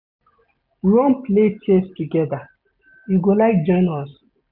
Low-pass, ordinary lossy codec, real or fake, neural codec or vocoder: 5.4 kHz; none; real; none